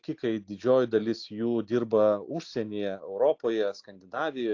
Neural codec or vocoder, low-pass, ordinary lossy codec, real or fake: none; 7.2 kHz; AAC, 48 kbps; real